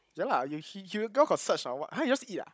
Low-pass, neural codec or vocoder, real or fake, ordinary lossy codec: none; codec, 16 kHz, 16 kbps, FunCodec, trained on Chinese and English, 50 frames a second; fake; none